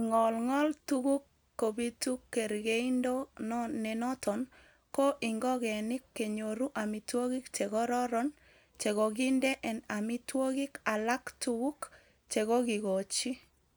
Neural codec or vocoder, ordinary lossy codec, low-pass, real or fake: none; none; none; real